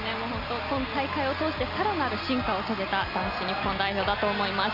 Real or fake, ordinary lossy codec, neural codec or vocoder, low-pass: real; MP3, 48 kbps; none; 5.4 kHz